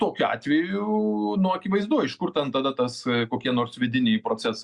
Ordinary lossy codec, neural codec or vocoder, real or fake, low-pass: Opus, 64 kbps; none; real; 10.8 kHz